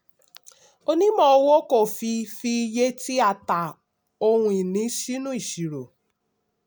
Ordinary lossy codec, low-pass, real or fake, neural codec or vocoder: none; none; real; none